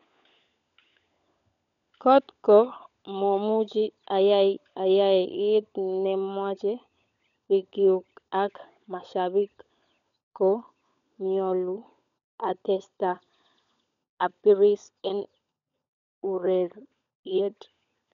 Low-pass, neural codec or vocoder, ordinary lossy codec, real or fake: 7.2 kHz; codec, 16 kHz, 16 kbps, FunCodec, trained on LibriTTS, 50 frames a second; none; fake